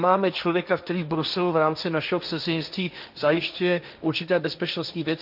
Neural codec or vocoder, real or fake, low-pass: codec, 16 kHz, 1.1 kbps, Voila-Tokenizer; fake; 5.4 kHz